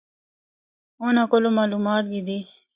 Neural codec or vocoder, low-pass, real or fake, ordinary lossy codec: none; 3.6 kHz; real; Opus, 64 kbps